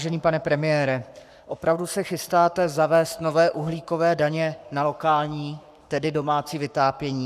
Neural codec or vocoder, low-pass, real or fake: codec, 44.1 kHz, 7.8 kbps, Pupu-Codec; 14.4 kHz; fake